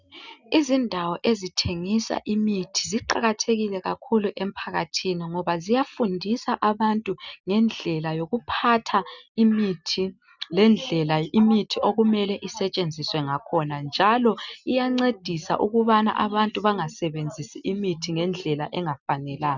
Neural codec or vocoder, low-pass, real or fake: none; 7.2 kHz; real